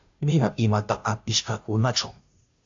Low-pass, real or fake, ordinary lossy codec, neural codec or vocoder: 7.2 kHz; fake; AAC, 64 kbps; codec, 16 kHz, 0.5 kbps, FunCodec, trained on Chinese and English, 25 frames a second